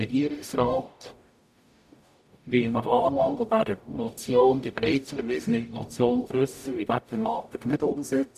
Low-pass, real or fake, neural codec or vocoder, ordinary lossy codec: 14.4 kHz; fake; codec, 44.1 kHz, 0.9 kbps, DAC; none